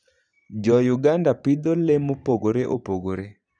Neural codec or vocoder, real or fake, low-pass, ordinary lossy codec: vocoder, 44.1 kHz, 128 mel bands every 512 samples, BigVGAN v2; fake; 9.9 kHz; none